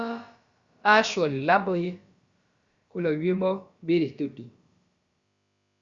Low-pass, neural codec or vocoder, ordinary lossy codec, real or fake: 7.2 kHz; codec, 16 kHz, about 1 kbps, DyCAST, with the encoder's durations; Opus, 64 kbps; fake